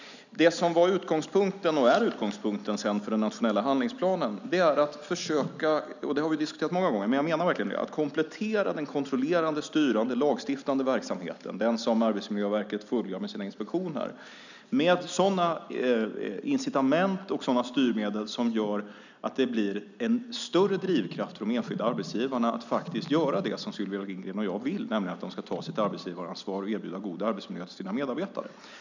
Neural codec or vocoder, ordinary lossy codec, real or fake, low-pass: none; none; real; 7.2 kHz